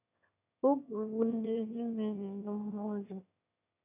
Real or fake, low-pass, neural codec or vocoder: fake; 3.6 kHz; autoencoder, 22.05 kHz, a latent of 192 numbers a frame, VITS, trained on one speaker